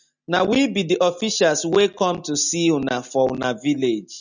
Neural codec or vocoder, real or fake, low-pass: none; real; 7.2 kHz